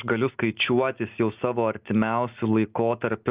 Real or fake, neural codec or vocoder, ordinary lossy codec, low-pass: real; none; Opus, 24 kbps; 3.6 kHz